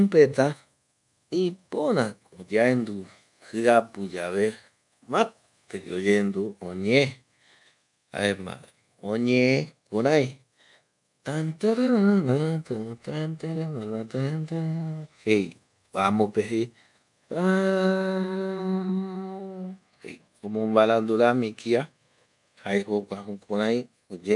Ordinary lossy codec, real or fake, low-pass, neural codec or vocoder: none; fake; 10.8 kHz; codec, 24 kHz, 1.2 kbps, DualCodec